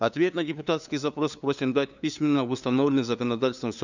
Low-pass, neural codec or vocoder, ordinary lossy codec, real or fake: 7.2 kHz; codec, 16 kHz, 2 kbps, FunCodec, trained on LibriTTS, 25 frames a second; none; fake